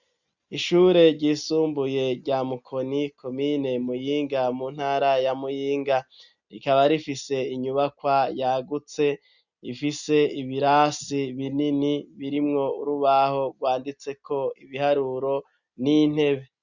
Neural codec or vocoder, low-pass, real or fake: none; 7.2 kHz; real